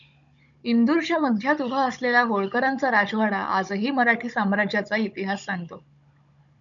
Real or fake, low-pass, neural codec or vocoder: fake; 7.2 kHz; codec, 16 kHz, 16 kbps, FunCodec, trained on Chinese and English, 50 frames a second